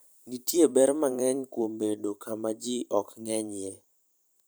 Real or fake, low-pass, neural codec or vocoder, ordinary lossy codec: fake; none; vocoder, 44.1 kHz, 128 mel bands every 512 samples, BigVGAN v2; none